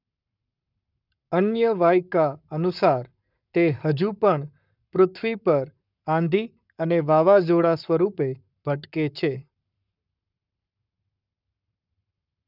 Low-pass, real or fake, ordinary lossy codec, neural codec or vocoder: 5.4 kHz; fake; none; codec, 44.1 kHz, 7.8 kbps, Pupu-Codec